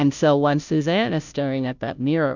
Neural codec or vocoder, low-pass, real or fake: codec, 16 kHz, 0.5 kbps, FunCodec, trained on Chinese and English, 25 frames a second; 7.2 kHz; fake